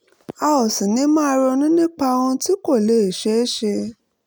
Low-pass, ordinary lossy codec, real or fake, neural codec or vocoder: none; none; real; none